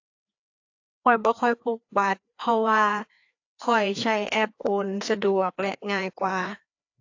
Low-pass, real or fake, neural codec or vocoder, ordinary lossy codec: 7.2 kHz; fake; codec, 16 kHz, 2 kbps, FreqCodec, larger model; AAC, 48 kbps